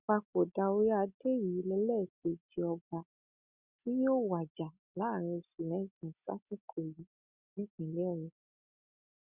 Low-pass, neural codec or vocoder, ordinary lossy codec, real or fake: 3.6 kHz; none; Opus, 64 kbps; real